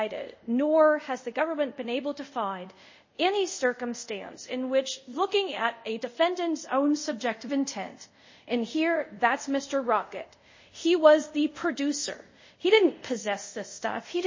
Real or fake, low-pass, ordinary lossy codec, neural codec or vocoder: fake; 7.2 kHz; MP3, 32 kbps; codec, 24 kHz, 0.5 kbps, DualCodec